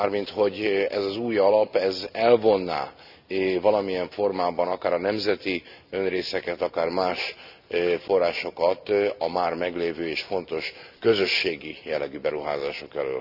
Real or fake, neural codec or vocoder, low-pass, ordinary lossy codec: real; none; 5.4 kHz; none